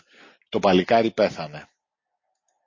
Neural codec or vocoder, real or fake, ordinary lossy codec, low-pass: none; real; MP3, 32 kbps; 7.2 kHz